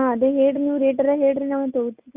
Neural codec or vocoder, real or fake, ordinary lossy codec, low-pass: none; real; Opus, 64 kbps; 3.6 kHz